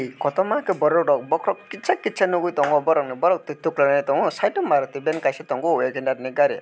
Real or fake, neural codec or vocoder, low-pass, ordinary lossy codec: real; none; none; none